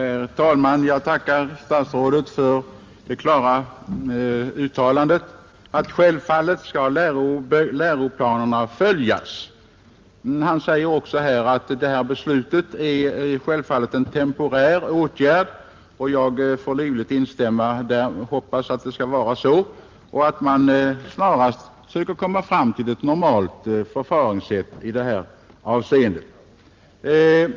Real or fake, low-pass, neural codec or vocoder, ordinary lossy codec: real; 7.2 kHz; none; Opus, 32 kbps